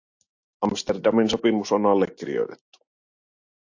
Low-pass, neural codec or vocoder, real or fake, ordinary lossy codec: 7.2 kHz; none; real; AAC, 48 kbps